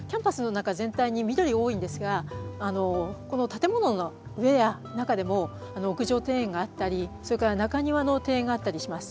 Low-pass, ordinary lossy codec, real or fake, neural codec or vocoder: none; none; real; none